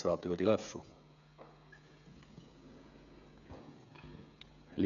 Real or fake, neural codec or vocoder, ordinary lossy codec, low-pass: fake; codec, 16 kHz, 16 kbps, FreqCodec, smaller model; AAC, 96 kbps; 7.2 kHz